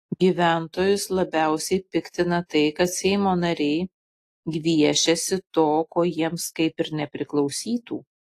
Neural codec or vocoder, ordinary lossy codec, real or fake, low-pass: none; AAC, 48 kbps; real; 14.4 kHz